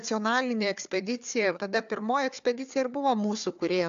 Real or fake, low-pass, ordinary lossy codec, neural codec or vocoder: fake; 7.2 kHz; AAC, 64 kbps; codec, 16 kHz, 4 kbps, FunCodec, trained on Chinese and English, 50 frames a second